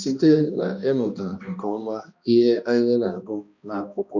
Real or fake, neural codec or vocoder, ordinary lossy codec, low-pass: fake; codec, 16 kHz, 1 kbps, X-Codec, HuBERT features, trained on balanced general audio; none; 7.2 kHz